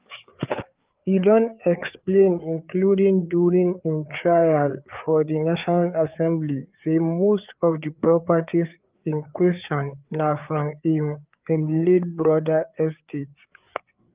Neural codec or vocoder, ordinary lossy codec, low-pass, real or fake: codec, 16 kHz, 4 kbps, FreqCodec, larger model; Opus, 32 kbps; 3.6 kHz; fake